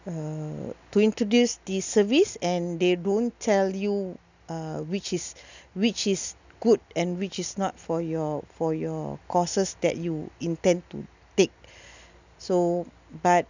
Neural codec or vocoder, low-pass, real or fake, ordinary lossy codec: none; 7.2 kHz; real; none